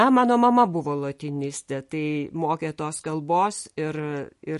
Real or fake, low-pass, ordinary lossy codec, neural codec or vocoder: real; 14.4 kHz; MP3, 48 kbps; none